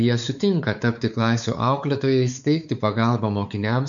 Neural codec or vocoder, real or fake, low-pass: codec, 16 kHz, 4 kbps, X-Codec, WavLM features, trained on Multilingual LibriSpeech; fake; 7.2 kHz